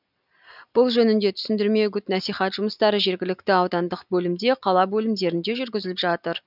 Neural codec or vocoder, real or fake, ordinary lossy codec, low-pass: none; real; none; 5.4 kHz